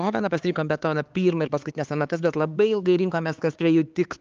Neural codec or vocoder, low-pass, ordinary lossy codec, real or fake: codec, 16 kHz, 4 kbps, X-Codec, HuBERT features, trained on LibriSpeech; 7.2 kHz; Opus, 32 kbps; fake